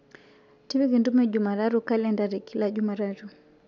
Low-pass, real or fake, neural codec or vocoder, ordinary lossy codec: 7.2 kHz; real; none; none